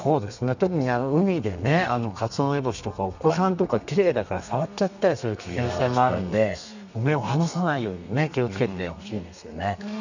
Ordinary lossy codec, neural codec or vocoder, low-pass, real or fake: none; codec, 32 kHz, 1.9 kbps, SNAC; 7.2 kHz; fake